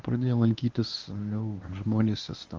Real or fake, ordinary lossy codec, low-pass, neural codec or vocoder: fake; Opus, 32 kbps; 7.2 kHz; codec, 24 kHz, 0.9 kbps, WavTokenizer, medium speech release version 1